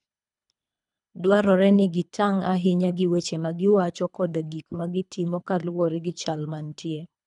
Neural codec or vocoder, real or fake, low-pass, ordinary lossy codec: codec, 24 kHz, 3 kbps, HILCodec; fake; 10.8 kHz; none